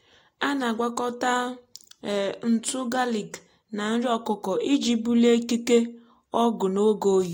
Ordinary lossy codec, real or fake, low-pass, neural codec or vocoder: AAC, 48 kbps; real; 19.8 kHz; none